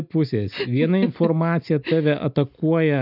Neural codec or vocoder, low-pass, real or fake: none; 5.4 kHz; real